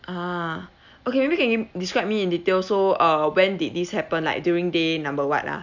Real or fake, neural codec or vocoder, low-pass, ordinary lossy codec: real; none; 7.2 kHz; none